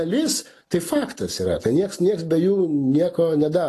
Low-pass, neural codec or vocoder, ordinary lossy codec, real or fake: 14.4 kHz; none; AAC, 48 kbps; real